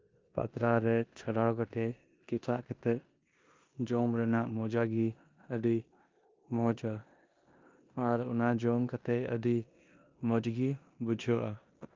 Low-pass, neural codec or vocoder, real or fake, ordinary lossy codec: 7.2 kHz; codec, 16 kHz in and 24 kHz out, 0.9 kbps, LongCat-Audio-Codec, four codebook decoder; fake; Opus, 24 kbps